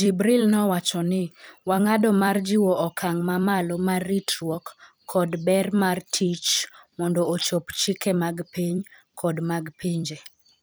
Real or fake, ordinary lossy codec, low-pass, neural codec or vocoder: fake; none; none; vocoder, 44.1 kHz, 128 mel bands every 256 samples, BigVGAN v2